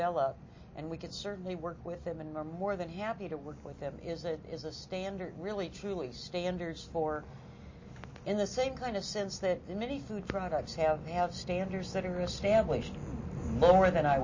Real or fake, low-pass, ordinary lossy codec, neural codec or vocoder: real; 7.2 kHz; MP3, 32 kbps; none